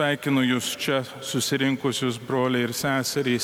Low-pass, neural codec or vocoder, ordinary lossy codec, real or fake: 19.8 kHz; none; MP3, 96 kbps; real